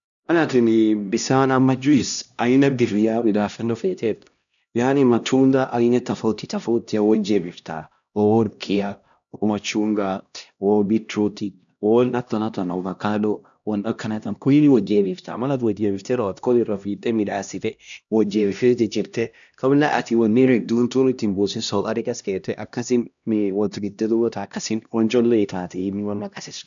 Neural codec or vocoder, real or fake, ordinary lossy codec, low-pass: codec, 16 kHz, 1 kbps, X-Codec, HuBERT features, trained on LibriSpeech; fake; none; 7.2 kHz